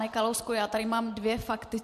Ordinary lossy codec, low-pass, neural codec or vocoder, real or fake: MP3, 64 kbps; 14.4 kHz; vocoder, 44.1 kHz, 128 mel bands every 256 samples, BigVGAN v2; fake